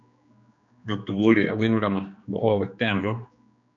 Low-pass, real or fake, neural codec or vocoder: 7.2 kHz; fake; codec, 16 kHz, 2 kbps, X-Codec, HuBERT features, trained on general audio